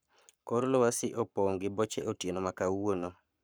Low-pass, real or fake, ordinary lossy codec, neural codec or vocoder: none; fake; none; codec, 44.1 kHz, 7.8 kbps, Pupu-Codec